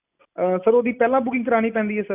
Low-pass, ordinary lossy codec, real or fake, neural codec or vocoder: 3.6 kHz; none; real; none